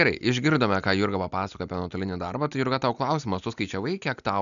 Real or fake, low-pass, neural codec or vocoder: real; 7.2 kHz; none